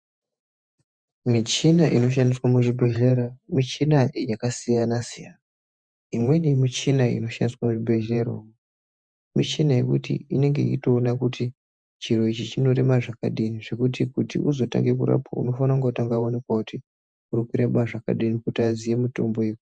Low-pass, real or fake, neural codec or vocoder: 9.9 kHz; fake; vocoder, 48 kHz, 128 mel bands, Vocos